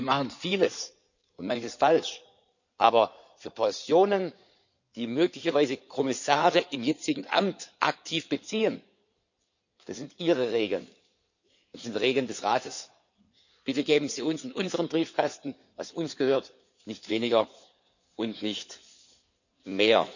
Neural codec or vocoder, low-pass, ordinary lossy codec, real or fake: codec, 16 kHz in and 24 kHz out, 2.2 kbps, FireRedTTS-2 codec; 7.2 kHz; none; fake